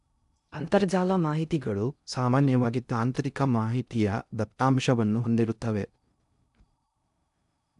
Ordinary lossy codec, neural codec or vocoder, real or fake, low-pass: none; codec, 16 kHz in and 24 kHz out, 0.6 kbps, FocalCodec, streaming, 2048 codes; fake; 10.8 kHz